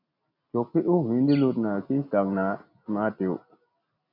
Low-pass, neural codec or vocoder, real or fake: 5.4 kHz; none; real